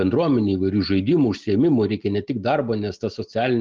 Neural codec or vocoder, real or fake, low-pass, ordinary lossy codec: none; real; 7.2 kHz; Opus, 24 kbps